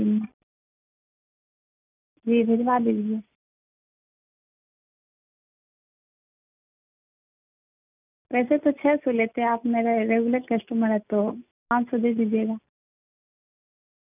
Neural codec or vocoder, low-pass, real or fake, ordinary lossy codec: none; 3.6 kHz; real; none